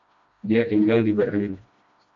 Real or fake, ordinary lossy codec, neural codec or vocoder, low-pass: fake; MP3, 48 kbps; codec, 16 kHz, 1 kbps, FreqCodec, smaller model; 7.2 kHz